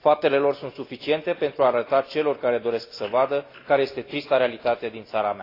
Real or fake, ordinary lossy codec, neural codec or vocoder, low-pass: real; AAC, 32 kbps; none; 5.4 kHz